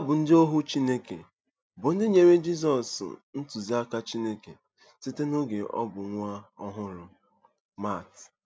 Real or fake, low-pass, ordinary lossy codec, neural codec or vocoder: real; none; none; none